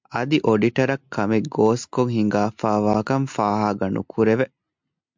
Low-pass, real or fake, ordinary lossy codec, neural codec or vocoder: 7.2 kHz; real; MP3, 64 kbps; none